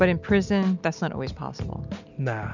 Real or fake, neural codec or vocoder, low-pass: real; none; 7.2 kHz